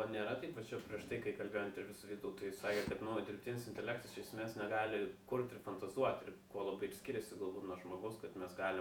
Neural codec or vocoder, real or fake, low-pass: vocoder, 48 kHz, 128 mel bands, Vocos; fake; 19.8 kHz